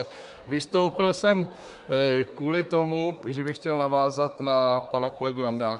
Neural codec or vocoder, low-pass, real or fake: codec, 24 kHz, 1 kbps, SNAC; 10.8 kHz; fake